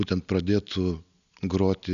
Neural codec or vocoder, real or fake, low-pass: none; real; 7.2 kHz